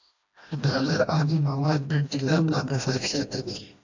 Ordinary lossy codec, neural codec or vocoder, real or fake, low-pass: AAC, 48 kbps; codec, 16 kHz, 1 kbps, FreqCodec, smaller model; fake; 7.2 kHz